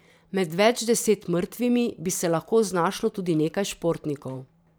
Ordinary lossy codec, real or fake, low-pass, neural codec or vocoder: none; fake; none; vocoder, 44.1 kHz, 128 mel bands every 512 samples, BigVGAN v2